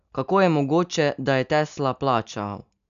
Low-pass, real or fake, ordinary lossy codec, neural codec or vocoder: 7.2 kHz; real; none; none